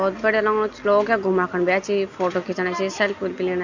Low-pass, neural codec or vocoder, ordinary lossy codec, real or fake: 7.2 kHz; none; none; real